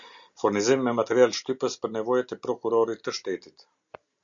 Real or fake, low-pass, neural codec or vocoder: real; 7.2 kHz; none